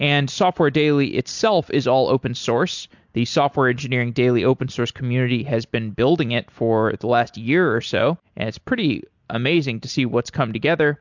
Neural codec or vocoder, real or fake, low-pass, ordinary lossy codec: none; real; 7.2 kHz; MP3, 64 kbps